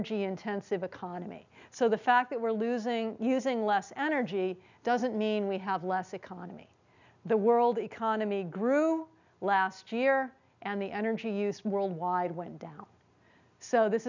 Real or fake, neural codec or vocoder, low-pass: real; none; 7.2 kHz